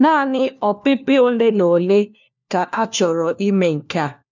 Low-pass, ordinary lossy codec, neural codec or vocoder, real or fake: 7.2 kHz; none; codec, 16 kHz, 1 kbps, FunCodec, trained on LibriTTS, 50 frames a second; fake